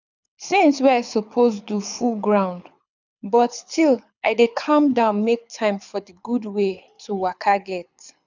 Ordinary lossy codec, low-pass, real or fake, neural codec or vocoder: none; 7.2 kHz; fake; codec, 24 kHz, 6 kbps, HILCodec